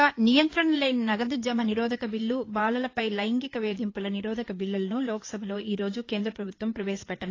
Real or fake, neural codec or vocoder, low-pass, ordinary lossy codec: fake; codec, 16 kHz in and 24 kHz out, 2.2 kbps, FireRedTTS-2 codec; 7.2 kHz; AAC, 32 kbps